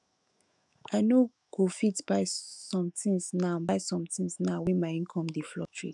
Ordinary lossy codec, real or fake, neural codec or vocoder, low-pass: none; fake; autoencoder, 48 kHz, 128 numbers a frame, DAC-VAE, trained on Japanese speech; 10.8 kHz